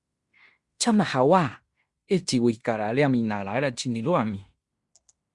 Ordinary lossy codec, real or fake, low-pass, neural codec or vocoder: Opus, 64 kbps; fake; 10.8 kHz; codec, 16 kHz in and 24 kHz out, 0.9 kbps, LongCat-Audio-Codec, fine tuned four codebook decoder